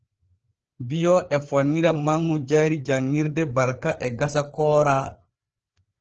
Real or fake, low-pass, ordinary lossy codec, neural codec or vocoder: fake; 7.2 kHz; Opus, 16 kbps; codec, 16 kHz, 4 kbps, FreqCodec, larger model